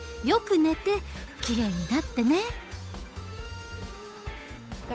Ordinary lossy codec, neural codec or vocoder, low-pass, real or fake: none; codec, 16 kHz, 8 kbps, FunCodec, trained on Chinese and English, 25 frames a second; none; fake